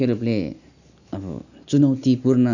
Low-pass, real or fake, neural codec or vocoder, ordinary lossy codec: 7.2 kHz; real; none; none